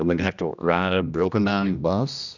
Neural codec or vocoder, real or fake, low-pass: codec, 16 kHz, 1 kbps, X-Codec, HuBERT features, trained on general audio; fake; 7.2 kHz